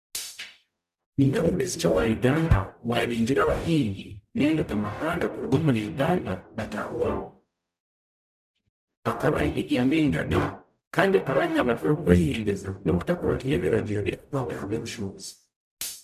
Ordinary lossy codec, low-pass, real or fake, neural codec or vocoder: none; 14.4 kHz; fake; codec, 44.1 kHz, 0.9 kbps, DAC